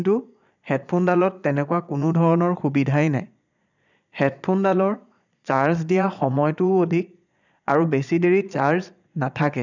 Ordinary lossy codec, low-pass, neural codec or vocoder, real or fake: none; 7.2 kHz; vocoder, 22.05 kHz, 80 mel bands, Vocos; fake